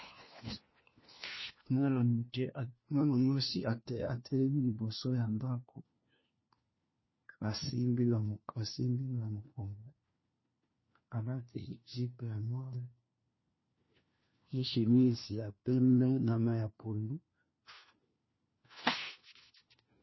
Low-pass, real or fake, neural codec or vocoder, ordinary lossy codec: 7.2 kHz; fake; codec, 16 kHz, 1 kbps, FunCodec, trained on LibriTTS, 50 frames a second; MP3, 24 kbps